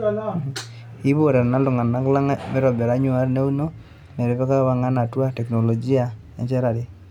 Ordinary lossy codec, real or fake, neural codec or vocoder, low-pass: none; real; none; 19.8 kHz